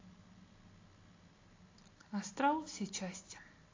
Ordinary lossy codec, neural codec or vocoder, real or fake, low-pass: AAC, 32 kbps; none; real; 7.2 kHz